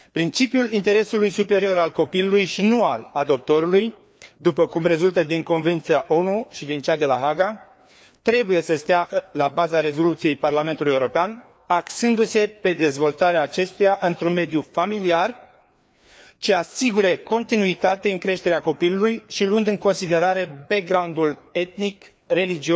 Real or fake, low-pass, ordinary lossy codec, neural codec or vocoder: fake; none; none; codec, 16 kHz, 2 kbps, FreqCodec, larger model